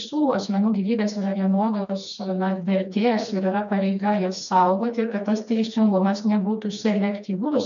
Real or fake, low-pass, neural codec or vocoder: fake; 7.2 kHz; codec, 16 kHz, 2 kbps, FreqCodec, smaller model